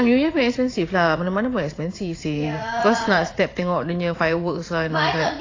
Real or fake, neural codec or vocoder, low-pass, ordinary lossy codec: fake; vocoder, 44.1 kHz, 80 mel bands, Vocos; 7.2 kHz; AAC, 32 kbps